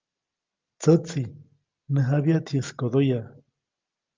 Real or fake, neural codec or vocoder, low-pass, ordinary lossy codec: real; none; 7.2 kHz; Opus, 24 kbps